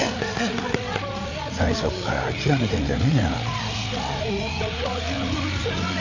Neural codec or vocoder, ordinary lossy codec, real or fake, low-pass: codec, 16 kHz, 8 kbps, FreqCodec, larger model; none; fake; 7.2 kHz